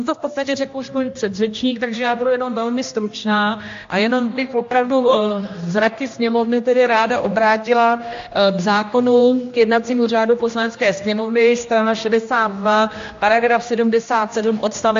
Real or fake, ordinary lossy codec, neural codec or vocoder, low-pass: fake; AAC, 48 kbps; codec, 16 kHz, 1 kbps, X-Codec, HuBERT features, trained on general audio; 7.2 kHz